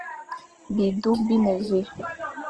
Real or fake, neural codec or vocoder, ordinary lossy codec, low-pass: real; none; Opus, 16 kbps; 9.9 kHz